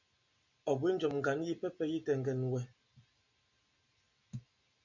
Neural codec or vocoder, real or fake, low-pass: none; real; 7.2 kHz